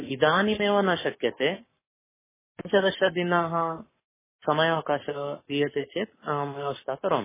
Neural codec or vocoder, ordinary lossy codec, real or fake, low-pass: codec, 44.1 kHz, 7.8 kbps, Pupu-Codec; MP3, 16 kbps; fake; 3.6 kHz